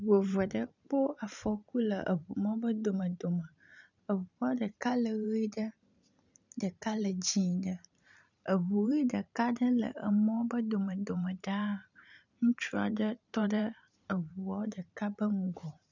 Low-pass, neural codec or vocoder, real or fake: 7.2 kHz; vocoder, 44.1 kHz, 128 mel bands every 512 samples, BigVGAN v2; fake